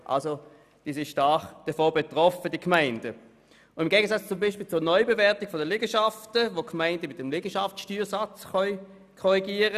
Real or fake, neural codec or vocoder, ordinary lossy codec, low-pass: real; none; none; 14.4 kHz